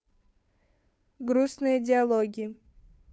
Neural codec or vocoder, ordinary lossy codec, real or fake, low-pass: codec, 16 kHz, 2 kbps, FunCodec, trained on Chinese and English, 25 frames a second; none; fake; none